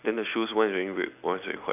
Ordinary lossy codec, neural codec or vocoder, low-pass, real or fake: none; none; 3.6 kHz; real